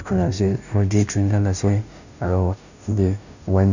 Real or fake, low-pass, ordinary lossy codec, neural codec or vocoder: fake; 7.2 kHz; none; codec, 16 kHz, 0.5 kbps, FunCodec, trained on Chinese and English, 25 frames a second